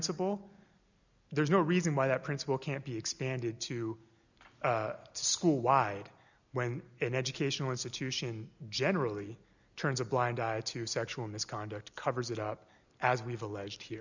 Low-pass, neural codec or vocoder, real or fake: 7.2 kHz; none; real